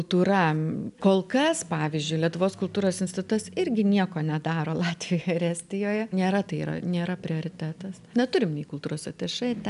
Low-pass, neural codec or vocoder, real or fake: 10.8 kHz; none; real